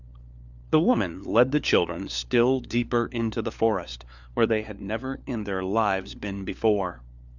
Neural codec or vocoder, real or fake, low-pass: codec, 16 kHz, 4 kbps, FunCodec, trained on LibriTTS, 50 frames a second; fake; 7.2 kHz